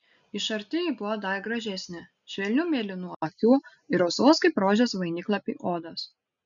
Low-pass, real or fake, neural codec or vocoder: 7.2 kHz; real; none